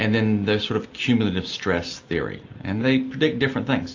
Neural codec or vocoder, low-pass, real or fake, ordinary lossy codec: none; 7.2 kHz; real; AAC, 48 kbps